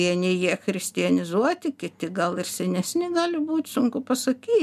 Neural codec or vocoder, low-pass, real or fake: none; 14.4 kHz; real